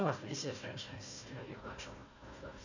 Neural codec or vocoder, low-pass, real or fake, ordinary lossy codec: codec, 16 kHz, 1 kbps, FunCodec, trained on Chinese and English, 50 frames a second; 7.2 kHz; fake; none